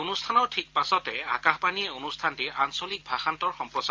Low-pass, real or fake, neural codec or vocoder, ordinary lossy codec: 7.2 kHz; real; none; Opus, 32 kbps